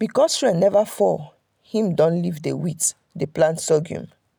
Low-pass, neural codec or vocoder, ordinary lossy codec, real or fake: none; none; none; real